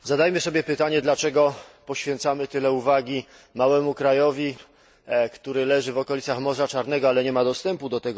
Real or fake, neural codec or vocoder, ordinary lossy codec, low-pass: real; none; none; none